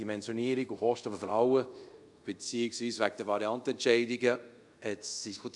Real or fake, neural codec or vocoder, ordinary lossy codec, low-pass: fake; codec, 24 kHz, 0.5 kbps, DualCodec; none; 10.8 kHz